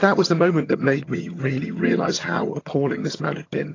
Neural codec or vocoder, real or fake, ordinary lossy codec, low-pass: vocoder, 22.05 kHz, 80 mel bands, HiFi-GAN; fake; AAC, 32 kbps; 7.2 kHz